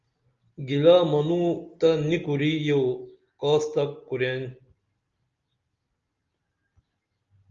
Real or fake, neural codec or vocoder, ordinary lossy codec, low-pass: real; none; Opus, 32 kbps; 7.2 kHz